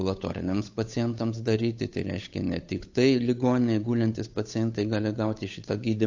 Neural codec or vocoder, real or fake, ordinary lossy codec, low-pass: codec, 16 kHz, 8 kbps, FunCodec, trained on Chinese and English, 25 frames a second; fake; AAC, 48 kbps; 7.2 kHz